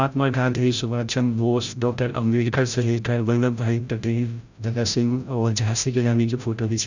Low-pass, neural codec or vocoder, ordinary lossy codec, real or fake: 7.2 kHz; codec, 16 kHz, 0.5 kbps, FreqCodec, larger model; none; fake